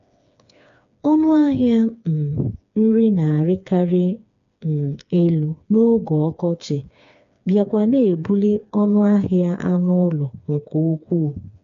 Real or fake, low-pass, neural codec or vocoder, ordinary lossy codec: fake; 7.2 kHz; codec, 16 kHz, 4 kbps, FreqCodec, smaller model; MP3, 64 kbps